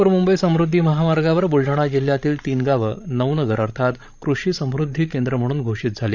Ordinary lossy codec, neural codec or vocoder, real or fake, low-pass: none; codec, 16 kHz, 16 kbps, FreqCodec, larger model; fake; 7.2 kHz